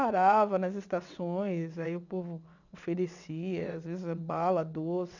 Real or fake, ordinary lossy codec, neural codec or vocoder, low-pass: fake; none; vocoder, 22.05 kHz, 80 mel bands, WaveNeXt; 7.2 kHz